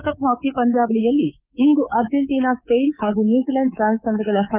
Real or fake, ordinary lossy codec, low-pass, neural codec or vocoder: fake; Opus, 24 kbps; 3.6 kHz; codec, 24 kHz, 3.1 kbps, DualCodec